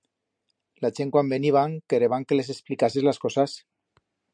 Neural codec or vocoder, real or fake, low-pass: none; real; 9.9 kHz